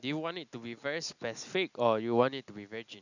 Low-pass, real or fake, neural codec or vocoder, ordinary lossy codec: 7.2 kHz; real; none; none